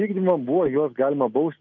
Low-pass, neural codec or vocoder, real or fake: 7.2 kHz; none; real